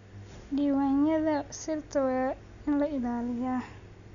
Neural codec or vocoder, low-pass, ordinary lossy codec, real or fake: none; 7.2 kHz; none; real